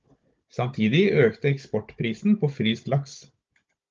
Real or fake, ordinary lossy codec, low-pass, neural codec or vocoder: fake; Opus, 24 kbps; 7.2 kHz; codec, 16 kHz, 16 kbps, FunCodec, trained on Chinese and English, 50 frames a second